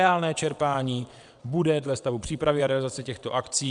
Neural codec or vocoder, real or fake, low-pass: vocoder, 22.05 kHz, 80 mel bands, WaveNeXt; fake; 9.9 kHz